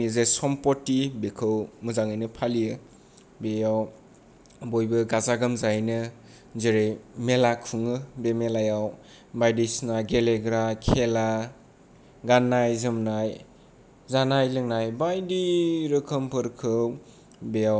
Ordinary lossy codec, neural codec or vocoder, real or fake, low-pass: none; none; real; none